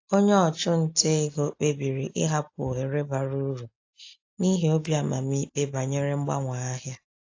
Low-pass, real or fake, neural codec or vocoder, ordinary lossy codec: 7.2 kHz; real; none; AAC, 48 kbps